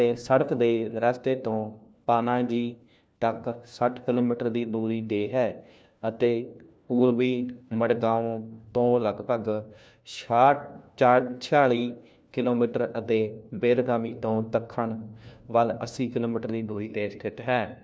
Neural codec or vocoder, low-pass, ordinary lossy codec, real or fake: codec, 16 kHz, 1 kbps, FunCodec, trained on LibriTTS, 50 frames a second; none; none; fake